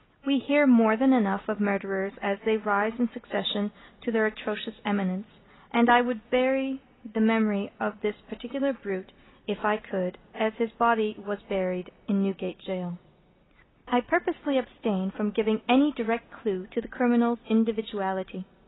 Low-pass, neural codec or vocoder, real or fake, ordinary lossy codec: 7.2 kHz; none; real; AAC, 16 kbps